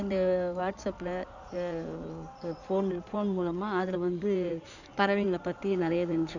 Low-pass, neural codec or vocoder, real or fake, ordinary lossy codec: 7.2 kHz; codec, 16 kHz in and 24 kHz out, 2.2 kbps, FireRedTTS-2 codec; fake; none